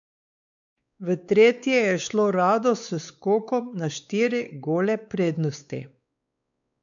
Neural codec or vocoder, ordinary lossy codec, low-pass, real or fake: codec, 16 kHz, 4 kbps, X-Codec, WavLM features, trained on Multilingual LibriSpeech; none; 7.2 kHz; fake